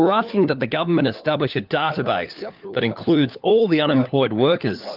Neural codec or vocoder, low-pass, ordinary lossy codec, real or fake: codec, 16 kHz, 4 kbps, FunCodec, trained on LibriTTS, 50 frames a second; 5.4 kHz; Opus, 24 kbps; fake